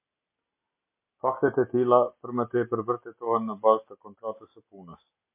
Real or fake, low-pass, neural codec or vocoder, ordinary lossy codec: real; 3.6 kHz; none; MP3, 24 kbps